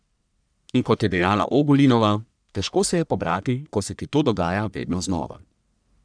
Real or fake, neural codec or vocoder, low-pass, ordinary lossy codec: fake; codec, 44.1 kHz, 1.7 kbps, Pupu-Codec; 9.9 kHz; none